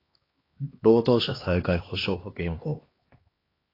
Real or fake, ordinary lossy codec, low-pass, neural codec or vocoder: fake; AAC, 24 kbps; 5.4 kHz; codec, 16 kHz, 2 kbps, X-Codec, HuBERT features, trained on LibriSpeech